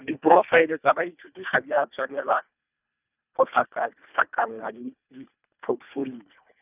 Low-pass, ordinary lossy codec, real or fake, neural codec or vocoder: 3.6 kHz; none; fake; codec, 24 kHz, 1.5 kbps, HILCodec